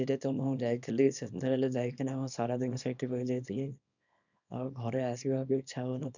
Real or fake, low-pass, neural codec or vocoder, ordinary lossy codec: fake; 7.2 kHz; codec, 24 kHz, 0.9 kbps, WavTokenizer, small release; none